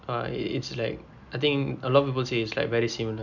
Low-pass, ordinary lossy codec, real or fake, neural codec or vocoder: 7.2 kHz; none; real; none